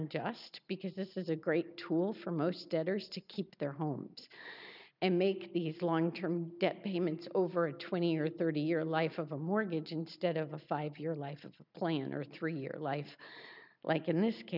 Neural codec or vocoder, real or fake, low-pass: none; real; 5.4 kHz